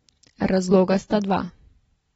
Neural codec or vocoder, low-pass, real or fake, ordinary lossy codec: none; 14.4 kHz; real; AAC, 24 kbps